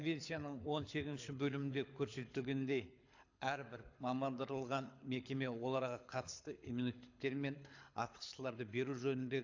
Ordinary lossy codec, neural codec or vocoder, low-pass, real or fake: AAC, 48 kbps; codec, 24 kHz, 6 kbps, HILCodec; 7.2 kHz; fake